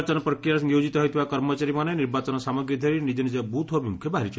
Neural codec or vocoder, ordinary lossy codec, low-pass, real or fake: none; none; none; real